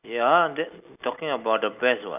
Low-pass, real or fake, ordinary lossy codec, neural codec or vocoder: 3.6 kHz; real; none; none